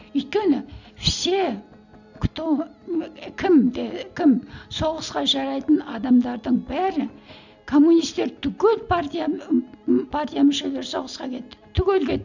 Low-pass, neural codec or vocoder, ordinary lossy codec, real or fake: 7.2 kHz; none; none; real